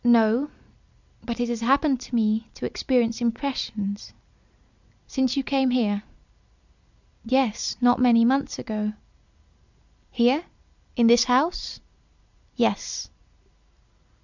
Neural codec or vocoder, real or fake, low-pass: none; real; 7.2 kHz